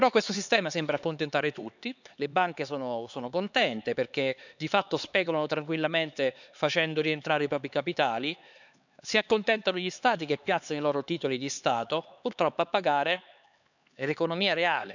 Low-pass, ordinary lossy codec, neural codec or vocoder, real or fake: 7.2 kHz; none; codec, 16 kHz, 4 kbps, X-Codec, HuBERT features, trained on LibriSpeech; fake